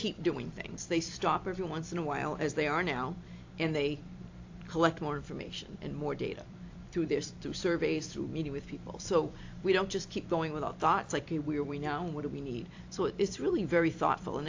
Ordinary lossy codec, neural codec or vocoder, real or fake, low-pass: AAC, 48 kbps; vocoder, 44.1 kHz, 128 mel bands every 256 samples, BigVGAN v2; fake; 7.2 kHz